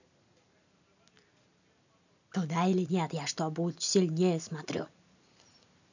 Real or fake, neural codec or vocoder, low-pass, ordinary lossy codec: real; none; 7.2 kHz; none